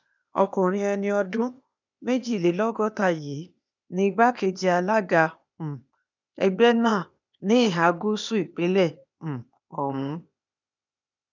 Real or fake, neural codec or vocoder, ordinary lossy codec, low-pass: fake; codec, 16 kHz, 0.8 kbps, ZipCodec; none; 7.2 kHz